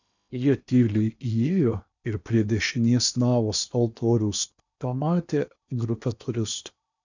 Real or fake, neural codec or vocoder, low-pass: fake; codec, 16 kHz in and 24 kHz out, 0.8 kbps, FocalCodec, streaming, 65536 codes; 7.2 kHz